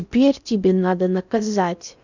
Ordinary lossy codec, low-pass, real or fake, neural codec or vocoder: none; 7.2 kHz; fake; codec, 16 kHz in and 24 kHz out, 0.8 kbps, FocalCodec, streaming, 65536 codes